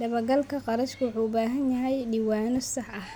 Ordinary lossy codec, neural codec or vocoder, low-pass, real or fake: none; none; none; real